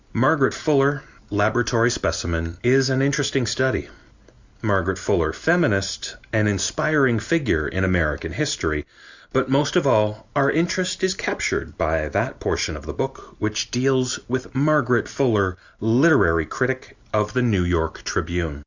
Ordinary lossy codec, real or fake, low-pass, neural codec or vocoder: Opus, 64 kbps; real; 7.2 kHz; none